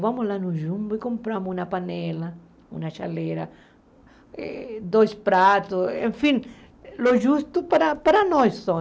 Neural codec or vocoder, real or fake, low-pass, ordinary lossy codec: none; real; none; none